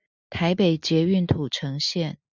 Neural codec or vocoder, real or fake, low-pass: none; real; 7.2 kHz